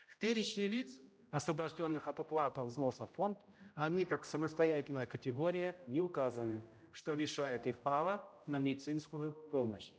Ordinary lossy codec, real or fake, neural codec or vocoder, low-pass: none; fake; codec, 16 kHz, 0.5 kbps, X-Codec, HuBERT features, trained on general audio; none